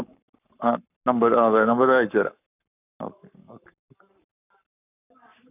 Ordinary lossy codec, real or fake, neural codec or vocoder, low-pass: none; fake; codec, 44.1 kHz, 7.8 kbps, Pupu-Codec; 3.6 kHz